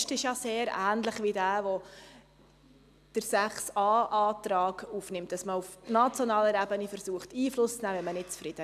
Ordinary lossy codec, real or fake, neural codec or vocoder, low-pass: AAC, 96 kbps; real; none; 14.4 kHz